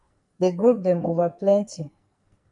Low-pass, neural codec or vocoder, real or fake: 10.8 kHz; codec, 44.1 kHz, 2.6 kbps, SNAC; fake